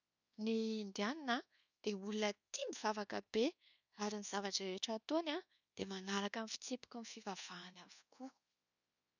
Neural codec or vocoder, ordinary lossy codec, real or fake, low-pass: codec, 24 kHz, 1.2 kbps, DualCodec; none; fake; 7.2 kHz